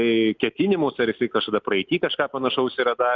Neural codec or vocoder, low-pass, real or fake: none; 7.2 kHz; real